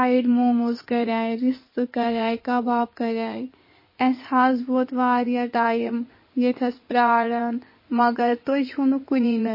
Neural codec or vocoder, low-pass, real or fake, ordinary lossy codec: codec, 16 kHz in and 24 kHz out, 2.2 kbps, FireRedTTS-2 codec; 5.4 kHz; fake; MP3, 24 kbps